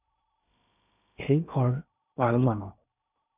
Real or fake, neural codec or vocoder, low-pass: fake; codec, 16 kHz in and 24 kHz out, 0.8 kbps, FocalCodec, streaming, 65536 codes; 3.6 kHz